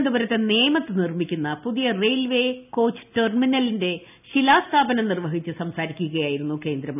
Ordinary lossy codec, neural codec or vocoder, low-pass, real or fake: none; none; 3.6 kHz; real